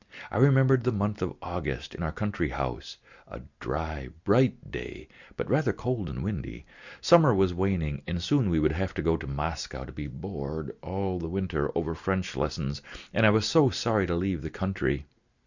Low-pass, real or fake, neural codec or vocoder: 7.2 kHz; real; none